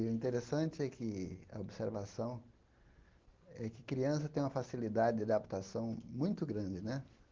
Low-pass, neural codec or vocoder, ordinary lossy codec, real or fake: 7.2 kHz; none; Opus, 16 kbps; real